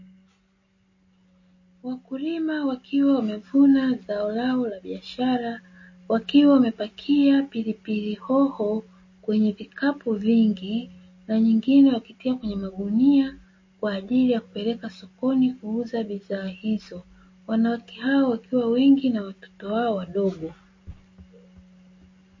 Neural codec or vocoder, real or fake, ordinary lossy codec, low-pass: none; real; MP3, 32 kbps; 7.2 kHz